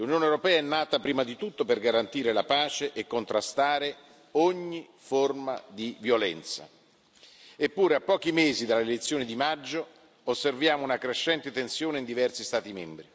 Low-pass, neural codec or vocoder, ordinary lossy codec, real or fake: none; none; none; real